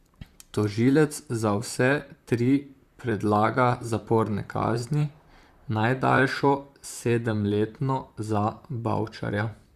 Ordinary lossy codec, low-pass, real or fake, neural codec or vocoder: Opus, 64 kbps; 14.4 kHz; fake; vocoder, 44.1 kHz, 128 mel bands, Pupu-Vocoder